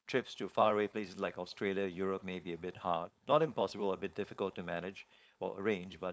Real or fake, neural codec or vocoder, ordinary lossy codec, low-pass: fake; codec, 16 kHz, 4.8 kbps, FACodec; none; none